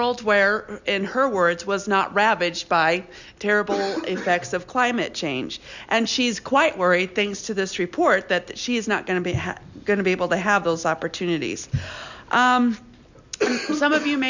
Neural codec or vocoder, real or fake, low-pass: none; real; 7.2 kHz